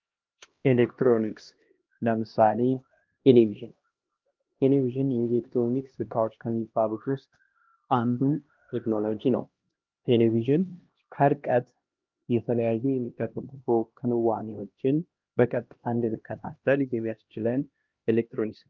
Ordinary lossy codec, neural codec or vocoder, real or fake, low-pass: Opus, 32 kbps; codec, 16 kHz, 1 kbps, X-Codec, HuBERT features, trained on LibriSpeech; fake; 7.2 kHz